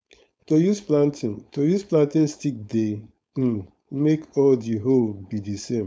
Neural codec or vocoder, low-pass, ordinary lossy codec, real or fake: codec, 16 kHz, 4.8 kbps, FACodec; none; none; fake